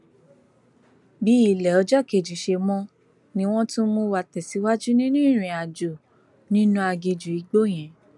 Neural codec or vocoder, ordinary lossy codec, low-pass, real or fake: none; none; 10.8 kHz; real